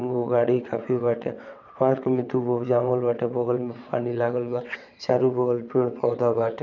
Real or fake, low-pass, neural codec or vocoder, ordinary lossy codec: fake; 7.2 kHz; vocoder, 22.05 kHz, 80 mel bands, WaveNeXt; none